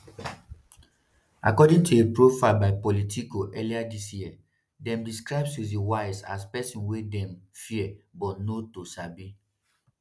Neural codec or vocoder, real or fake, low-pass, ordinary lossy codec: none; real; none; none